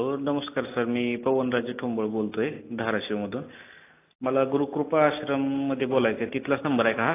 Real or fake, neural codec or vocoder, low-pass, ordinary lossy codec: real; none; 3.6 kHz; AAC, 24 kbps